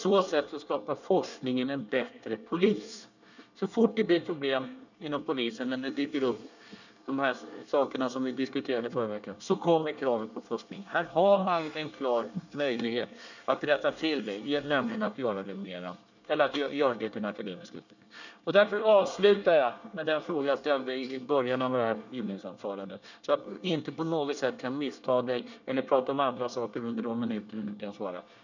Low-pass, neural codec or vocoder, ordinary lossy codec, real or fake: 7.2 kHz; codec, 24 kHz, 1 kbps, SNAC; none; fake